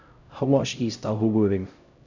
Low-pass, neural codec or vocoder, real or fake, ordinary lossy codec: 7.2 kHz; codec, 16 kHz, 0.5 kbps, X-Codec, HuBERT features, trained on LibriSpeech; fake; none